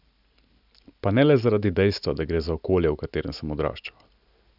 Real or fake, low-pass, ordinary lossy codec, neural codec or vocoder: real; 5.4 kHz; none; none